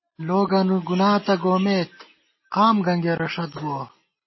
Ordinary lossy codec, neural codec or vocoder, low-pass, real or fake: MP3, 24 kbps; none; 7.2 kHz; real